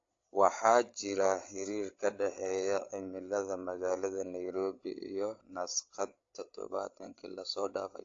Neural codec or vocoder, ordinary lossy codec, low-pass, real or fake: codec, 16 kHz, 8 kbps, FreqCodec, larger model; AAC, 48 kbps; 7.2 kHz; fake